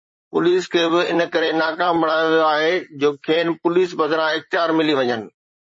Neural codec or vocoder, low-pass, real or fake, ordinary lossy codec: vocoder, 44.1 kHz, 128 mel bands, Pupu-Vocoder; 9.9 kHz; fake; MP3, 32 kbps